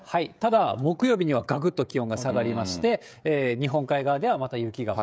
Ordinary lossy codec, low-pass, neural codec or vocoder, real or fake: none; none; codec, 16 kHz, 16 kbps, FreqCodec, smaller model; fake